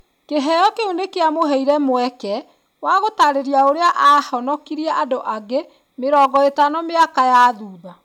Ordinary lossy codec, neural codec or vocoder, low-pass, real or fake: none; none; 19.8 kHz; real